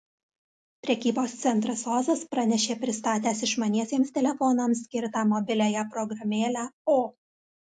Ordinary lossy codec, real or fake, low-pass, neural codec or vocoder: AAC, 64 kbps; real; 10.8 kHz; none